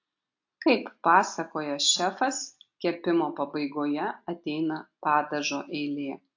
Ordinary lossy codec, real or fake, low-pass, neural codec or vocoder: AAC, 48 kbps; real; 7.2 kHz; none